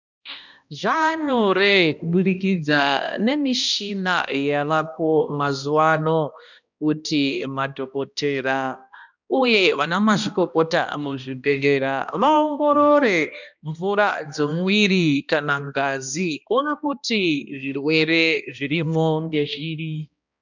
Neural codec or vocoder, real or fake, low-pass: codec, 16 kHz, 1 kbps, X-Codec, HuBERT features, trained on balanced general audio; fake; 7.2 kHz